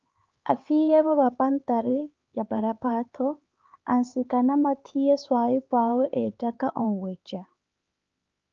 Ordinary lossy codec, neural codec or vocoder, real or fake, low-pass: Opus, 24 kbps; codec, 16 kHz, 4 kbps, X-Codec, HuBERT features, trained on LibriSpeech; fake; 7.2 kHz